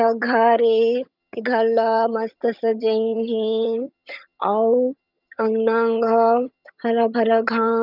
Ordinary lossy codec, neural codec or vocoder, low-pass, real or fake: none; vocoder, 22.05 kHz, 80 mel bands, HiFi-GAN; 5.4 kHz; fake